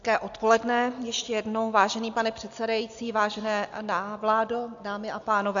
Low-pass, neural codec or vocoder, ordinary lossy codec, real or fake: 7.2 kHz; none; AAC, 64 kbps; real